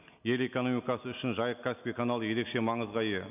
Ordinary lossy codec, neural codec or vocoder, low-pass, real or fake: none; none; 3.6 kHz; real